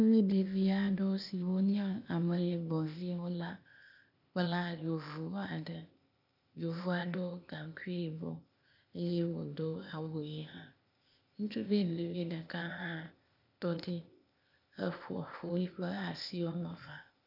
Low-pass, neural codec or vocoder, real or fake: 5.4 kHz; codec, 16 kHz, 0.8 kbps, ZipCodec; fake